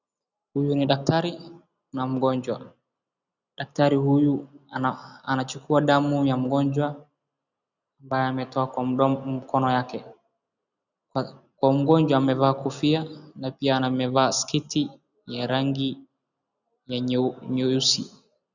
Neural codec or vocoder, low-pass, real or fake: none; 7.2 kHz; real